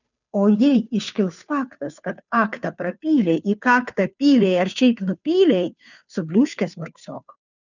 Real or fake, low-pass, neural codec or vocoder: fake; 7.2 kHz; codec, 16 kHz, 2 kbps, FunCodec, trained on Chinese and English, 25 frames a second